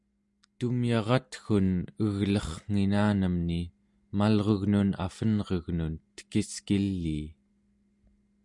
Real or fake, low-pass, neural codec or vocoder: real; 10.8 kHz; none